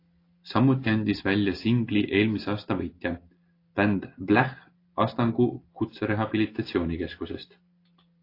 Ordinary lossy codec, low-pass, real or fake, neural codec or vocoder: AAC, 32 kbps; 5.4 kHz; real; none